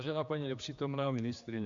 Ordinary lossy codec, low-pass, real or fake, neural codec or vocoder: Opus, 64 kbps; 7.2 kHz; fake; codec, 16 kHz, 2 kbps, X-Codec, HuBERT features, trained on general audio